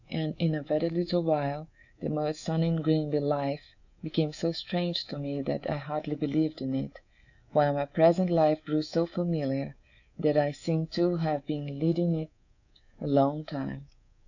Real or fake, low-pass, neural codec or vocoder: fake; 7.2 kHz; codec, 24 kHz, 3.1 kbps, DualCodec